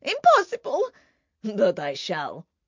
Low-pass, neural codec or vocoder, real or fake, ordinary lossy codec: 7.2 kHz; none; real; MP3, 64 kbps